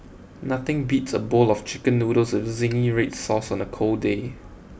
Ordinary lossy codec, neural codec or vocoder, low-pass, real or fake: none; none; none; real